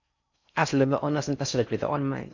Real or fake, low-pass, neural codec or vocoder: fake; 7.2 kHz; codec, 16 kHz in and 24 kHz out, 0.6 kbps, FocalCodec, streaming, 4096 codes